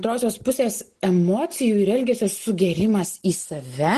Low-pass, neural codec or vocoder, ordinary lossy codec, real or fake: 10.8 kHz; none; Opus, 16 kbps; real